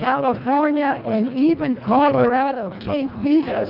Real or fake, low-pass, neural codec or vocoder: fake; 5.4 kHz; codec, 24 kHz, 1.5 kbps, HILCodec